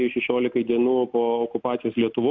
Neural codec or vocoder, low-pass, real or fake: none; 7.2 kHz; real